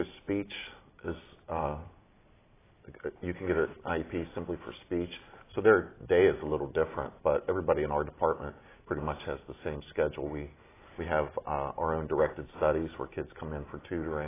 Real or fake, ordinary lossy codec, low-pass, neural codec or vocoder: real; AAC, 16 kbps; 3.6 kHz; none